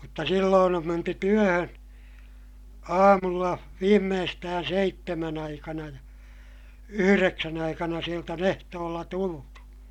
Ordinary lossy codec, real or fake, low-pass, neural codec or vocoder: MP3, 96 kbps; real; 19.8 kHz; none